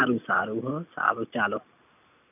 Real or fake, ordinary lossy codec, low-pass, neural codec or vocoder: real; none; 3.6 kHz; none